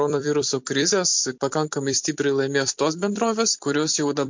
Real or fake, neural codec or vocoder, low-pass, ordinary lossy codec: fake; vocoder, 44.1 kHz, 128 mel bands every 256 samples, BigVGAN v2; 7.2 kHz; MP3, 48 kbps